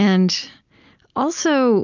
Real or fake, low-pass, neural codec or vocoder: real; 7.2 kHz; none